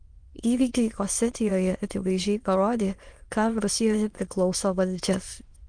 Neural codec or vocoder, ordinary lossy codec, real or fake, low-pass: autoencoder, 22.05 kHz, a latent of 192 numbers a frame, VITS, trained on many speakers; Opus, 24 kbps; fake; 9.9 kHz